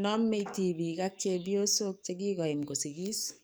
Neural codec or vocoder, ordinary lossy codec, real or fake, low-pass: codec, 44.1 kHz, 7.8 kbps, DAC; none; fake; none